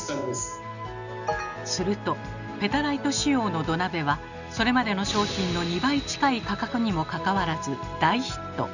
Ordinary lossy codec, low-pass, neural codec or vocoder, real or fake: none; 7.2 kHz; none; real